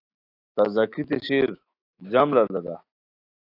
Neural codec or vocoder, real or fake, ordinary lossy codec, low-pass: autoencoder, 48 kHz, 128 numbers a frame, DAC-VAE, trained on Japanese speech; fake; AAC, 32 kbps; 5.4 kHz